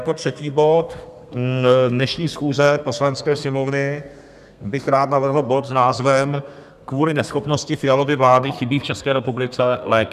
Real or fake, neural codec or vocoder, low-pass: fake; codec, 32 kHz, 1.9 kbps, SNAC; 14.4 kHz